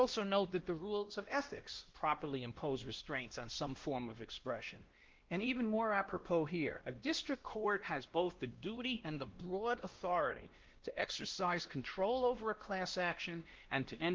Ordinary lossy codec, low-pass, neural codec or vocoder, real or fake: Opus, 16 kbps; 7.2 kHz; codec, 16 kHz, 1 kbps, X-Codec, WavLM features, trained on Multilingual LibriSpeech; fake